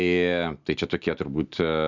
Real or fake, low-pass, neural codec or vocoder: real; 7.2 kHz; none